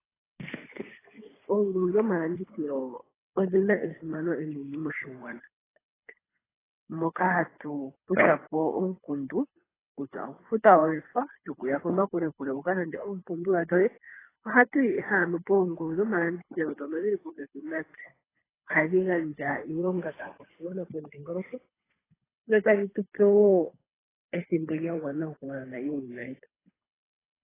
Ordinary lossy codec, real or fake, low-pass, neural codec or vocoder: AAC, 16 kbps; fake; 3.6 kHz; codec, 24 kHz, 3 kbps, HILCodec